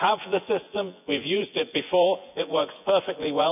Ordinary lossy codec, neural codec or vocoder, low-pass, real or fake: none; vocoder, 24 kHz, 100 mel bands, Vocos; 3.6 kHz; fake